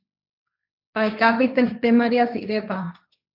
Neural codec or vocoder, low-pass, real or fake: codec, 16 kHz, 1.1 kbps, Voila-Tokenizer; 5.4 kHz; fake